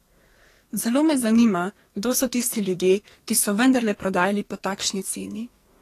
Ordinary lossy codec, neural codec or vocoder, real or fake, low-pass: AAC, 48 kbps; codec, 44.1 kHz, 2.6 kbps, SNAC; fake; 14.4 kHz